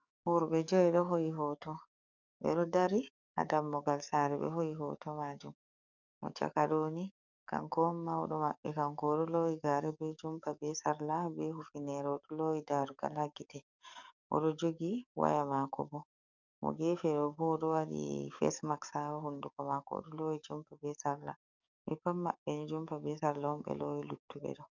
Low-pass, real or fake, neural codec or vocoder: 7.2 kHz; fake; codec, 44.1 kHz, 7.8 kbps, DAC